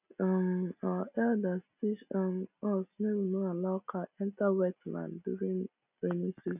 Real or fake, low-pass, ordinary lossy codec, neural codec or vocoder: real; 3.6 kHz; none; none